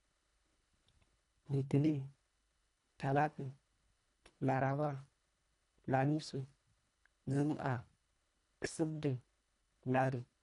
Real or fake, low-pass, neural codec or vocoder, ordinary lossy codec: fake; 10.8 kHz; codec, 24 kHz, 1.5 kbps, HILCodec; none